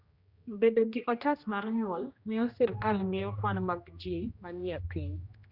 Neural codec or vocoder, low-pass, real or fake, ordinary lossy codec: codec, 16 kHz, 1 kbps, X-Codec, HuBERT features, trained on general audio; 5.4 kHz; fake; Opus, 64 kbps